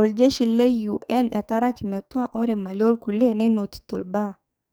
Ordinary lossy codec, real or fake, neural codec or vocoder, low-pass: none; fake; codec, 44.1 kHz, 2.6 kbps, SNAC; none